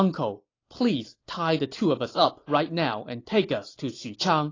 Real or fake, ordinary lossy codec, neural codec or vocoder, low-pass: real; AAC, 32 kbps; none; 7.2 kHz